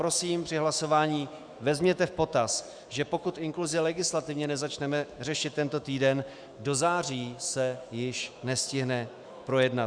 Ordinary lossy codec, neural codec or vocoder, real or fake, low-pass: Opus, 64 kbps; autoencoder, 48 kHz, 128 numbers a frame, DAC-VAE, trained on Japanese speech; fake; 9.9 kHz